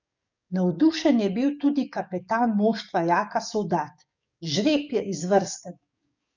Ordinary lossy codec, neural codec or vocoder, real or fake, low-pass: none; codec, 44.1 kHz, 7.8 kbps, DAC; fake; 7.2 kHz